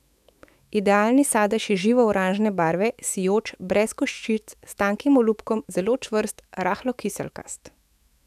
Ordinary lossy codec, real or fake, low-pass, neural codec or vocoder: none; fake; 14.4 kHz; autoencoder, 48 kHz, 128 numbers a frame, DAC-VAE, trained on Japanese speech